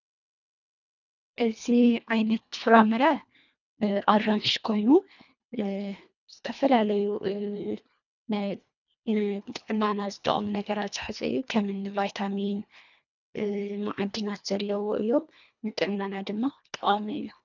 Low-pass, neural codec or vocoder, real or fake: 7.2 kHz; codec, 24 kHz, 1.5 kbps, HILCodec; fake